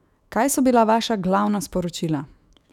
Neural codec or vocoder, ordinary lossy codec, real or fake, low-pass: autoencoder, 48 kHz, 128 numbers a frame, DAC-VAE, trained on Japanese speech; none; fake; 19.8 kHz